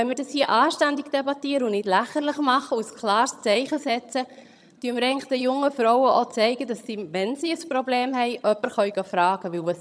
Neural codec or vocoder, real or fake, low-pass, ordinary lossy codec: vocoder, 22.05 kHz, 80 mel bands, HiFi-GAN; fake; none; none